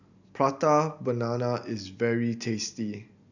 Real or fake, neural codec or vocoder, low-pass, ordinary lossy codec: real; none; 7.2 kHz; none